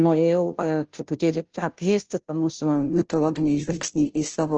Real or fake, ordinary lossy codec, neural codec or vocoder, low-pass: fake; Opus, 16 kbps; codec, 16 kHz, 0.5 kbps, FunCodec, trained on Chinese and English, 25 frames a second; 7.2 kHz